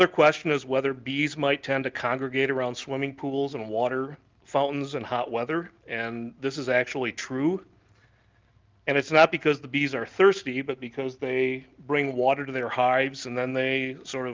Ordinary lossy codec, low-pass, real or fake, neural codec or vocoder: Opus, 32 kbps; 7.2 kHz; real; none